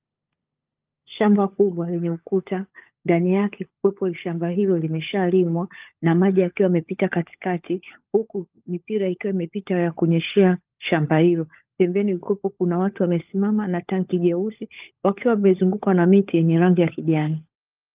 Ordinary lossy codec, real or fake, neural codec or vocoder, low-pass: Opus, 24 kbps; fake; codec, 16 kHz, 16 kbps, FunCodec, trained on LibriTTS, 50 frames a second; 3.6 kHz